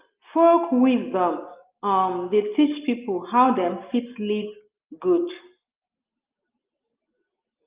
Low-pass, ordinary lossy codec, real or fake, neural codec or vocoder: 3.6 kHz; Opus, 24 kbps; real; none